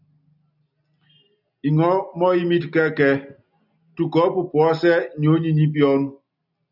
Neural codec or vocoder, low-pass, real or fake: none; 5.4 kHz; real